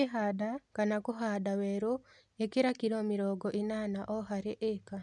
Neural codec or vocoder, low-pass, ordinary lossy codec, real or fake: none; 10.8 kHz; MP3, 96 kbps; real